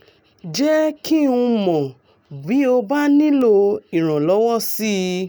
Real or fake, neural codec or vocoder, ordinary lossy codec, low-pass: real; none; none; none